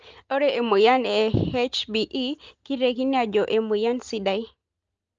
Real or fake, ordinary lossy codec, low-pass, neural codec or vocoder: real; Opus, 24 kbps; 7.2 kHz; none